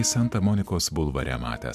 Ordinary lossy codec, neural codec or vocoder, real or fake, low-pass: MP3, 96 kbps; none; real; 14.4 kHz